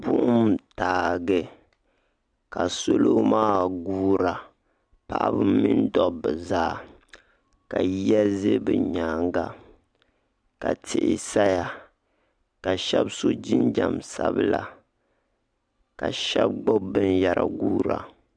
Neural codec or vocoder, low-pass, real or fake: none; 9.9 kHz; real